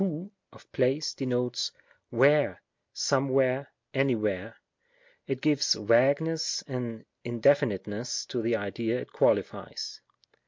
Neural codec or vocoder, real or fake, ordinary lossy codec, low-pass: none; real; MP3, 48 kbps; 7.2 kHz